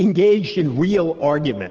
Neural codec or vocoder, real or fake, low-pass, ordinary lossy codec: codec, 16 kHz, 6 kbps, DAC; fake; 7.2 kHz; Opus, 16 kbps